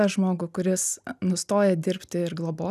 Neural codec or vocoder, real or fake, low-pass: none; real; 14.4 kHz